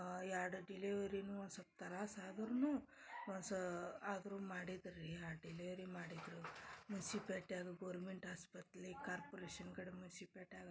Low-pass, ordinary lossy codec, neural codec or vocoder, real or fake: none; none; none; real